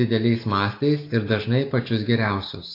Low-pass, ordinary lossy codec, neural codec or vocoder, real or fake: 5.4 kHz; AAC, 32 kbps; none; real